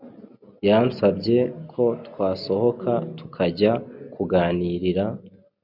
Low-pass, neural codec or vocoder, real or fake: 5.4 kHz; none; real